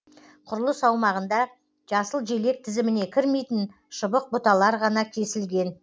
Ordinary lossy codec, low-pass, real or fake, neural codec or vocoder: none; none; real; none